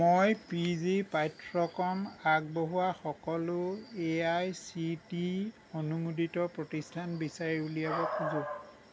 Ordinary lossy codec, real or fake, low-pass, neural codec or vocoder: none; real; none; none